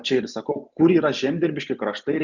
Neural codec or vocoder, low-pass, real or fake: none; 7.2 kHz; real